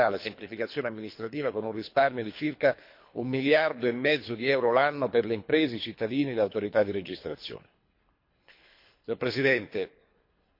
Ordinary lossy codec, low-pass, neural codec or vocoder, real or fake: MP3, 32 kbps; 5.4 kHz; codec, 24 kHz, 3 kbps, HILCodec; fake